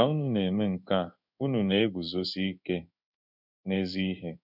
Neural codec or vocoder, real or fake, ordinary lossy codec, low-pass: codec, 16 kHz in and 24 kHz out, 1 kbps, XY-Tokenizer; fake; none; 5.4 kHz